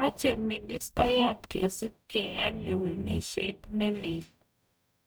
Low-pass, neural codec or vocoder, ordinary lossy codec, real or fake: none; codec, 44.1 kHz, 0.9 kbps, DAC; none; fake